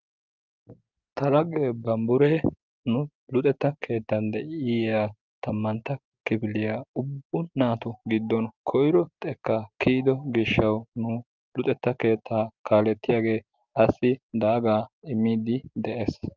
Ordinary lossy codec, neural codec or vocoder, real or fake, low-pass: Opus, 24 kbps; none; real; 7.2 kHz